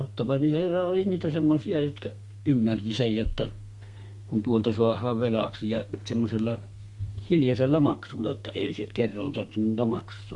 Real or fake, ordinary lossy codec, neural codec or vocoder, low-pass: fake; Opus, 64 kbps; codec, 32 kHz, 1.9 kbps, SNAC; 10.8 kHz